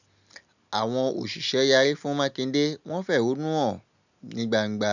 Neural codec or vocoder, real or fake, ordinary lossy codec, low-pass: none; real; none; 7.2 kHz